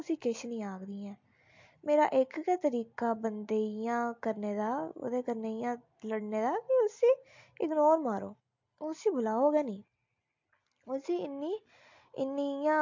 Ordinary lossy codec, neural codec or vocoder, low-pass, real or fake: MP3, 48 kbps; none; 7.2 kHz; real